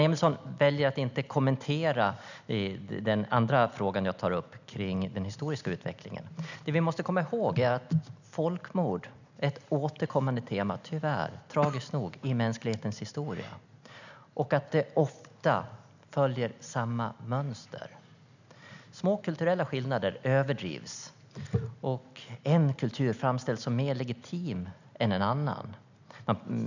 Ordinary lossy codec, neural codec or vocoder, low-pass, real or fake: none; none; 7.2 kHz; real